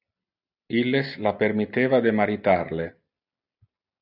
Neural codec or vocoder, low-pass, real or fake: none; 5.4 kHz; real